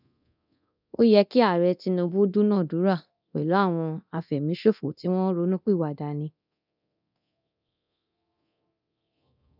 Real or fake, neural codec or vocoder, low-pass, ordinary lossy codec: fake; codec, 24 kHz, 0.9 kbps, DualCodec; 5.4 kHz; none